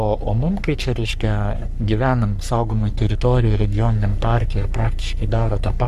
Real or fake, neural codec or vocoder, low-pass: fake; codec, 44.1 kHz, 3.4 kbps, Pupu-Codec; 14.4 kHz